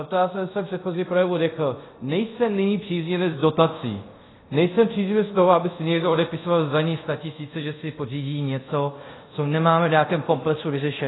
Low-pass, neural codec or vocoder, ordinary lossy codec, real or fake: 7.2 kHz; codec, 24 kHz, 0.5 kbps, DualCodec; AAC, 16 kbps; fake